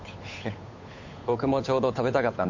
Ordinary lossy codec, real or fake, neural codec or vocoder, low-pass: MP3, 48 kbps; fake; codec, 16 kHz, 8 kbps, FunCodec, trained on Chinese and English, 25 frames a second; 7.2 kHz